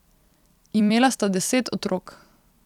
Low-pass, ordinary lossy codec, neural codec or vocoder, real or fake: 19.8 kHz; none; vocoder, 44.1 kHz, 128 mel bands every 256 samples, BigVGAN v2; fake